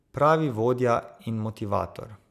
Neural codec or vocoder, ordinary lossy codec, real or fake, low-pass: none; none; real; 14.4 kHz